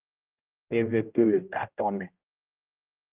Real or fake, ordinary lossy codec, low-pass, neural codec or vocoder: fake; Opus, 24 kbps; 3.6 kHz; codec, 16 kHz, 0.5 kbps, X-Codec, HuBERT features, trained on general audio